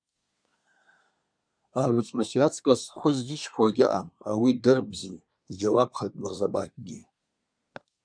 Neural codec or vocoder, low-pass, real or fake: codec, 24 kHz, 1 kbps, SNAC; 9.9 kHz; fake